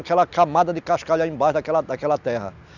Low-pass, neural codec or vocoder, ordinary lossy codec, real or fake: 7.2 kHz; none; none; real